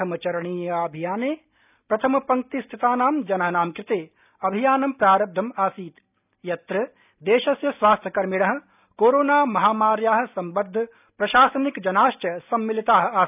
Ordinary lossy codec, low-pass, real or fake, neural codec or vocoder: none; 3.6 kHz; real; none